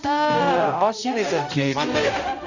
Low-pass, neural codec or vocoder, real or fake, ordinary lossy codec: 7.2 kHz; codec, 16 kHz, 0.5 kbps, X-Codec, HuBERT features, trained on balanced general audio; fake; none